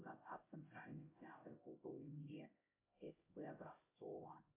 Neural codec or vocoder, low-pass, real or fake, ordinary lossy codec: codec, 16 kHz, 0.5 kbps, X-Codec, HuBERT features, trained on LibriSpeech; 3.6 kHz; fake; AAC, 24 kbps